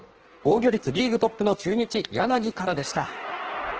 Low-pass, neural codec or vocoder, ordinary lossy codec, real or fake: 7.2 kHz; codec, 16 kHz in and 24 kHz out, 1.1 kbps, FireRedTTS-2 codec; Opus, 16 kbps; fake